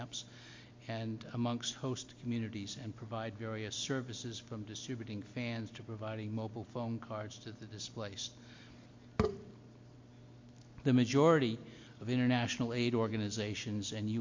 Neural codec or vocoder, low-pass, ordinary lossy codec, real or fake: none; 7.2 kHz; MP3, 48 kbps; real